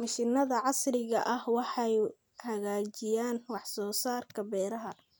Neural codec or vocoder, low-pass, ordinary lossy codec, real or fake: none; none; none; real